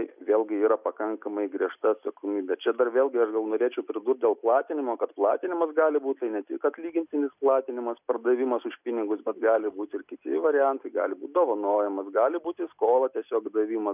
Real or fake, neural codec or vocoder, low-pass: real; none; 3.6 kHz